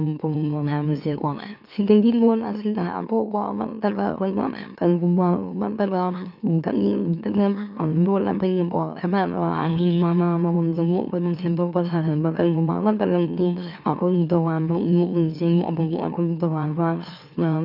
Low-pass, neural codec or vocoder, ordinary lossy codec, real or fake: 5.4 kHz; autoencoder, 44.1 kHz, a latent of 192 numbers a frame, MeloTTS; none; fake